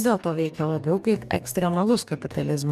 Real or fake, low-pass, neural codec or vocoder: fake; 14.4 kHz; codec, 44.1 kHz, 2.6 kbps, DAC